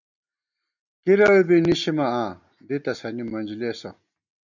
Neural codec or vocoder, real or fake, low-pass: none; real; 7.2 kHz